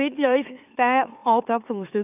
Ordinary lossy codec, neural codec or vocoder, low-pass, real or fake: none; autoencoder, 44.1 kHz, a latent of 192 numbers a frame, MeloTTS; 3.6 kHz; fake